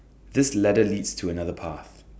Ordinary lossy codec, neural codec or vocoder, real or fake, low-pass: none; none; real; none